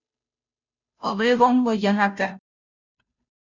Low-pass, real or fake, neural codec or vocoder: 7.2 kHz; fake; codec, 16 kHz, 0.5 kbps, FunCodec, trained on Chinese and English, 25 frames a second